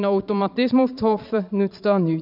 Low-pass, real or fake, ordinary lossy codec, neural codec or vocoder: 5.4 kHz; fake; none; vocoder, 22.05 kHz, 80 mel bands, Vocos